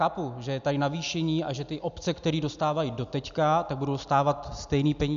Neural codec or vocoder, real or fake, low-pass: none; real; 7.2 kHz